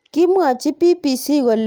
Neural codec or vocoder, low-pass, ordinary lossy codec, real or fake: none; 19.8 kHz; Opus, 32 kbps; real